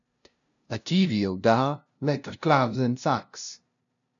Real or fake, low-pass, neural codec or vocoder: fake; 7.2 kHz; codec, 16 kHz, 0.5 kbps, FunCodec, trained on LibriTTS, 25 frames a second